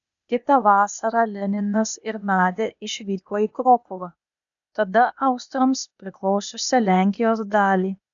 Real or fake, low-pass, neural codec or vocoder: fake; 7.2 kHz; codec, 16 kHz, 0.8 kbps, ZipCodec